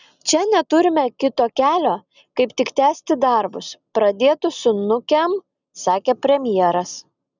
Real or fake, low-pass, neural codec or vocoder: real; 7.2 kHz; none